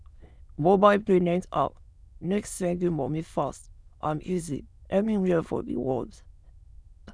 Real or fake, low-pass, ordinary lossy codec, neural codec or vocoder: fake; none; none; autoencoder, 22.05 kHz, a latent of 192 numbers a frame, VITS, trained on many speakers